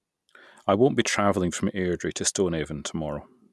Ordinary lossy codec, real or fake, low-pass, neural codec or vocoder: none; real; none; none